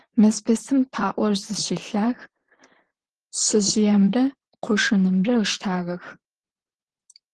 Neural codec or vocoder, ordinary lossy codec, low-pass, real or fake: vocoder, 44.1 kHz, 128 mel bands, Pupu-Vocoder; Opus, 16 kbps; 10.8 kHz; fake